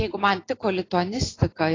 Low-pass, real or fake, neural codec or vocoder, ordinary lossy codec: 7.2 kHz; real; none; AAC, 32 kbps